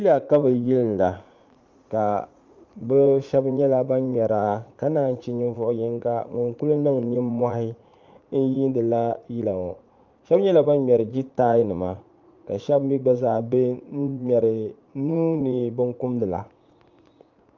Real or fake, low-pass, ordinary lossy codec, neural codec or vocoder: fake; 7.2 kHz; Opus, 24 kbps; vocoder, 44.1 kHz, 80 mel bands, Vocos